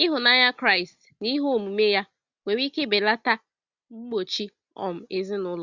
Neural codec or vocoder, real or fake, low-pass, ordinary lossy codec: none; real; 7.2 kHz; Opus, 64 kbps